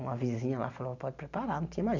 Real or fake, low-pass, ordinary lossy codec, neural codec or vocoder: real; 7.2 kHz; none; none